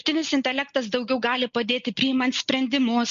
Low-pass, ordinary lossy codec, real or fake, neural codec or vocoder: 7.2 kHz; MP3, 48 kbps; real; none